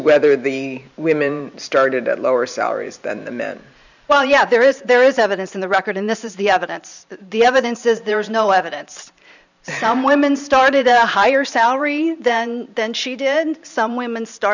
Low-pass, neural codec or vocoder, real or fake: 7.2 kHz; vocoder, 44.1 kHz, 128 mel bands every 512 samples, BigVGAN v2; fake